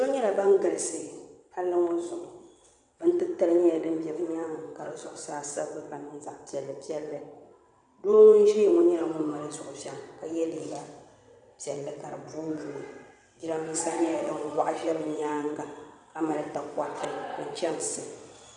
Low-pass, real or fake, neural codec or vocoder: 9.9 kHz; fake; vocoder, 22.05 kHz, 80 mel bands, WaveNeXt